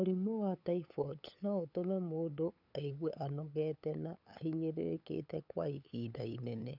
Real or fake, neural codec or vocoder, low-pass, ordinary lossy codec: fake; codec, 16 kHz, 8 kbps, FunCodec, trained on LibriTTS, 25 frames a second; 5.4 kHz; none